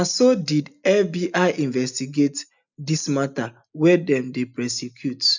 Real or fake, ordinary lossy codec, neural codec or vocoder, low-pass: real; none; none; 7.2 kHz